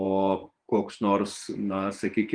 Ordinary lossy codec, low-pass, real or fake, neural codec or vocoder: Opus, 32 kbps; 9.9 kHz; fake; vocoder, 48 kHz, 128 mel bands, Vocos